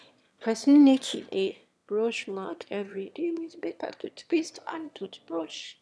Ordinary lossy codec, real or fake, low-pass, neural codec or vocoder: none; fake; none; autoencoder, 22.05 kHz, a latent of 192 numbers a frame, VITS, trained on one speaker